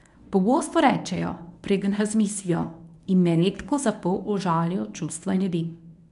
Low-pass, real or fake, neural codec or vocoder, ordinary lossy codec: 10.8 kHz; fake; codec, 24 kHz, 0.9 kbps, WavTokenizer, medium speech release version 1; none